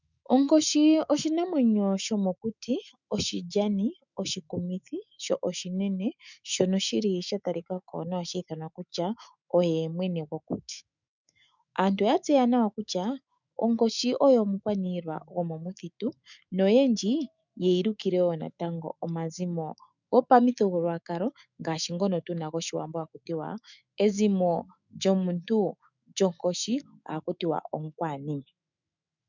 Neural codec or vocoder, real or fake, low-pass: autoencoder, 48 kHz, 128 numbers a frame, DAC-VAE, trained on Japanese speech; fake; 7.2 kHz